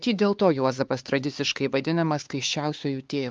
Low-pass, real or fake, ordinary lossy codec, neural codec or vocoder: 7.2 kHz; fake; Opus, 32 kbps; codec, 16 kHz, 4 kbps, X-Codec, HuBERT features, trained on LibriSpeech